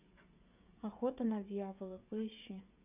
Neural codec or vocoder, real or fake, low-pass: codec, 16 kHz, 16 kbps, FreqCodec, smaller model; fake; 3.6 kHz